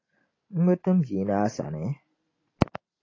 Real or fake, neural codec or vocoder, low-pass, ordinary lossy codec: real; none; 7.2 kHz; AAC, 32 kbps